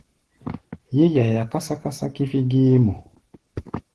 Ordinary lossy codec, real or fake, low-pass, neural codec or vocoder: Opus, 16 kbps; fake; 10.8 kHz; codec, 44.1 kHz, 7.8 kbps, Pupu-Codec